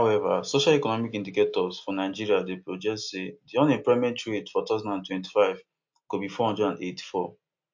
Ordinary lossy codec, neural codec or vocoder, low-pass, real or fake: MP3, 48 kbps; none; 7.2 kHz; real